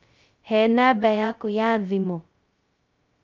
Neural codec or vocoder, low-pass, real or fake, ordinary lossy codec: codec, 16 kHz, 0.2 kbps, FocalCodec; 7.2 kHz; fake; Opus, 24 kbps